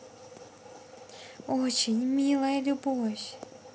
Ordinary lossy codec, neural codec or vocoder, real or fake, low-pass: none; none; real; none